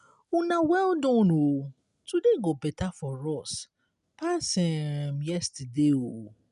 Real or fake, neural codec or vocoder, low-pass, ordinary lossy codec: real; none; 10.8 kHz; none